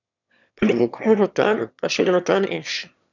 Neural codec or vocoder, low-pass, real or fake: autoencoder, 22.05 kHz, a latent of 192 numbers a frame, VITS, trained on one speaker; 7.2 kHz; fake